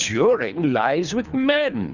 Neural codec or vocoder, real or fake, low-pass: codec, 24 kHz, 3 kbps, HILCodec; fake; 7.2 kHz